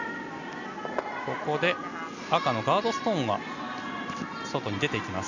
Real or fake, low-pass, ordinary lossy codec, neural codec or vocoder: real; 7.2 kHz; none; none